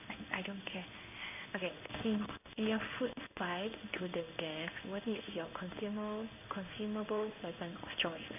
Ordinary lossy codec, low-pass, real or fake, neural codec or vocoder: none; 3.6 kHz; fake; codec, 16 kHz in and 24 kHz out, 1 kbps, XY-Tokenizer